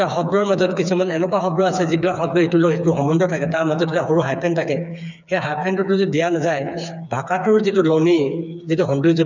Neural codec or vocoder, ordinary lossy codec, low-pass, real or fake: codec, 16 kHz, 4 kbps, FreqCodec, smaller model; none; 7.2 kHz; fake